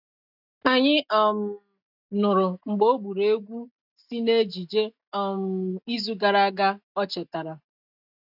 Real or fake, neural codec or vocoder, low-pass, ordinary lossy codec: real; none; 5.4 kHz; none